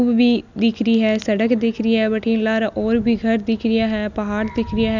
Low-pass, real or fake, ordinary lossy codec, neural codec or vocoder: 7.2 kHz; real; none; none